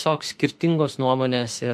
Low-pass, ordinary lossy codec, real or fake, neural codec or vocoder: 14.4 kHz; MP3, 64 kbps; fake; autoencoder, 48 kHz, 32 numbers a frame, DAC-VAE, trained on Japanese speech